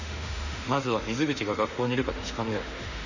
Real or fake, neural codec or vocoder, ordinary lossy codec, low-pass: fake; autoencoder, 48 kHz, 32 numbers a frame, DAC-VAE, trained on Japanese speech; none; 7.2 kHz